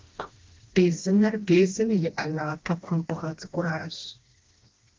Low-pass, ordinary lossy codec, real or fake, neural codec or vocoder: 7.2 kHz; Opus, 32 kbps; fake; codec, 16 kHz, 1 kbps, FreqCodec, smaller model